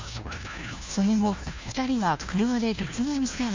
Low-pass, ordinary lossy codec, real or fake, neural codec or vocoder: 7.2 kHz; MP3, 64 kbps; fake; codec, 16 kHz, 1 kbps, FunCodec, trained on LibriTTS, 50 frames a second